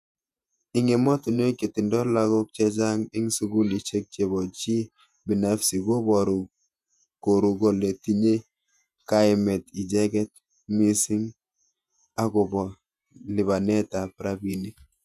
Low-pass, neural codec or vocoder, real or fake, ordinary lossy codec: 14.4 kHz; vocoder, 48 kHz, 128 mel bands, Vocos; fake; none